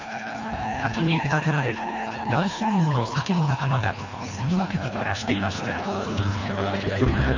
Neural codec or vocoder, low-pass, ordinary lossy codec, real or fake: codec, 24 kHz, 1.5 kbps, HILCodec; 7.2 kHz; MP3, 48 kbps; fake